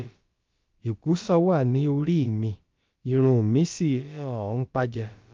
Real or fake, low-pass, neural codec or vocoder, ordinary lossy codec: fake; 7.2 kHz; codec, 16 kHz, about 1 kbps, DyCAST, with the encoder's durations; Opus, 32 kbps